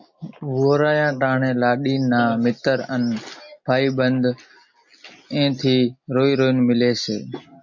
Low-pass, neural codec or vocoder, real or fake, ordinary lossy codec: 7.2 kHz; none; real; MP3, 48 kbps